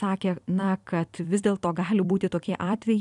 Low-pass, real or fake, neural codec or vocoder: 10.8 kHz; fake; vocoder, 44.1 kHz, 128 mel bands every 256 samples, BigVGAN v2